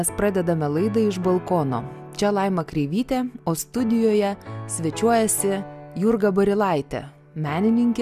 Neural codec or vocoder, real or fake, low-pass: none; real; 14.4 kHz